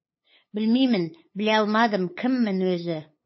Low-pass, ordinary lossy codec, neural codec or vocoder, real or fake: 7.2 kHz; MP3, 24 kbps; codec, 16 kHz, 8 kbps, FunCodec, trained on LibriTTS, 25 frames a second; fake